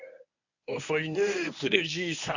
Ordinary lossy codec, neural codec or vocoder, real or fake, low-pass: none; codec, 24 kHz, 0.9 kbps, WavTokenizer, medium speech release version 1; fake; 7.2 kHz